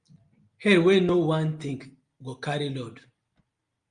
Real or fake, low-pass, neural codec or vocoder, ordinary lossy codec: real; 9.9 kHz; none; Opus, 32 kbps